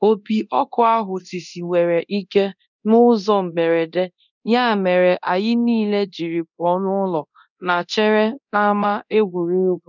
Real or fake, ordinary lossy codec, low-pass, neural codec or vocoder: fake; none; 7.2 kHz; codec, 24 kHz, 0.9 kbps, DualCodec